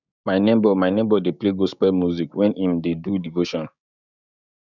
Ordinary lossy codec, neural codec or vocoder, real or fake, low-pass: none; codec, 16 kHz, 6 kbps, DAC; fake; 7.2 kHz